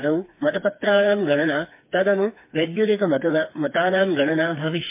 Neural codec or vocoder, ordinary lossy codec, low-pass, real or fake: codec, 16 kHz, 4 kbps, FreqCodec, smaller model; MP3, 24 kbps; 3.6 kHz; fake